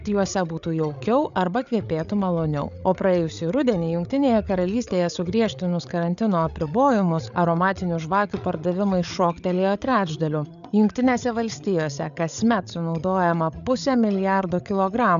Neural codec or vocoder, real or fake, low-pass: codec, 16 kHz, 8 kbps, FreqCodec, larger model; fake; 7.2 kHz